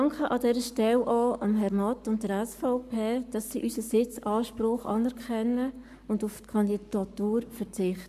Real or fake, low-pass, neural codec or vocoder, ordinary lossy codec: fake; 14.4 kHz; codec, 44.1 kHz, 7.8 kbps, Pupu-Codec; none